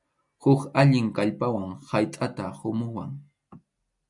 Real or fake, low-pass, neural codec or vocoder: real; 10.8 kHz; none